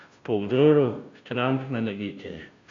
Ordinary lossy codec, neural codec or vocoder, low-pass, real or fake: AAC, 64 kbps; codec, 16 kHz, 0.5 kbps, FunCodec, trained on Chinese and English, 25 frames a second; 7.2 kHz; fake